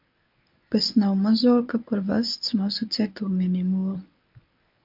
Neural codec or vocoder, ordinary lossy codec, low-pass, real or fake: codec, 24 kHz, 0.9 kbps, WavTokenizer, medium speech release version 1; MP3, 48 kbps; 5.4 kHz; fake